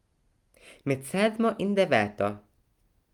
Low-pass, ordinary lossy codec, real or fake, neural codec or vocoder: 19.8 kHz; Opus, 32 kbps; real; none